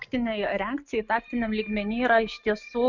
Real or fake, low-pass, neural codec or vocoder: real; 7.2 kHz; none